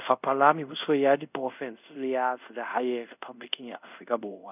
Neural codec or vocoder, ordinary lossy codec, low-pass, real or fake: codec, 24 kHz, 0.5 kbps, DualCodec; none; 3.6 kHz; fake